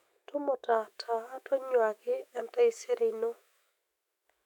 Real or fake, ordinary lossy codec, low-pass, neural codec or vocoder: fake; none; 19.8 kHz; autoencoder, 48 kHz, 128 numbers a frame, DAC-VAE, trained on Japanese speech